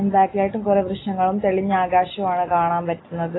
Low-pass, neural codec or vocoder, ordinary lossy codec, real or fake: 7.2 kHz; none; AAC, 16 kbps; real